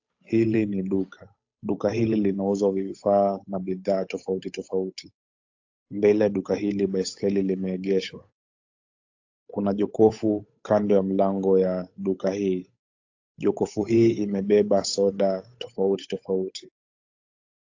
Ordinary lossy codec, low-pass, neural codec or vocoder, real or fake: AAC, 48 kbps; 7.2 kHz; codec, 16 kHz, 8 kbps, FunCodec, trained on Chinese and English, 25 frames a second; fake